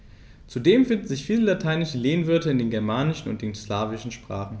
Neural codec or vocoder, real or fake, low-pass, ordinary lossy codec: none; real; none; none